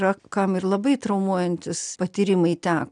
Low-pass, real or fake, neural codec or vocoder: 9.9 kHz; real; none